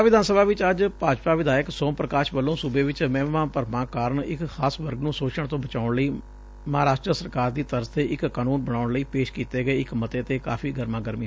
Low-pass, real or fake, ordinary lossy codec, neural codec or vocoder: none; real; none; none